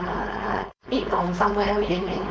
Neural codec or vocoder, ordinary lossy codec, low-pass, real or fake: codec, 16 kHz, 4.8 kbps, FACodec; none; none; fake